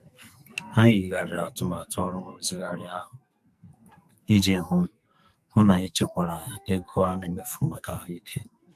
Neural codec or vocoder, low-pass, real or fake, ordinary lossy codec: codec, 44.1 kHz, 2.6 kbps, SNAC; 14.4 kHz; fake; none